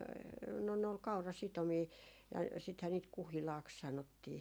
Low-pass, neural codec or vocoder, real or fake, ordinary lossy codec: none; none; real; none